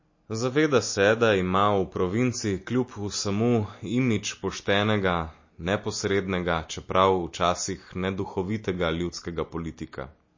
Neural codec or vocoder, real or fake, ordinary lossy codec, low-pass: none; real; MP3, 32 kbps; 7.2 kHz